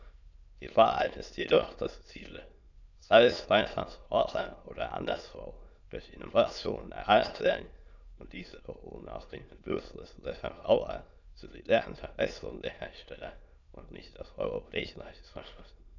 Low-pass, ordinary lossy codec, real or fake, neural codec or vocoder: 7.2 kHz; none; fake; autoencoder, 22.05 kHz, a latent of 192 numbers a frame, VITS, trained on many speakers